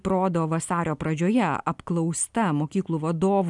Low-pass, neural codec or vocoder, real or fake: 10.8 kHz; none; real